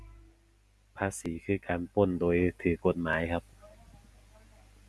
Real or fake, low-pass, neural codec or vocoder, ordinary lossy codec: real; none; none; none